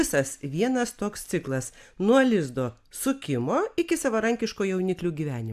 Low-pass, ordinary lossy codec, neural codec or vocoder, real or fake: 14.4 kHz; AAC, 96 kbps; none; real